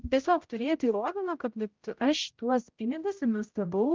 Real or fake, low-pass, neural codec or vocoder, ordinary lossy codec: fake; 7.2 kHz; codec, 16 kHz, 0.5 kbps, X-Codec, HuBERT features, trained on general audio; Opus, 24 kbps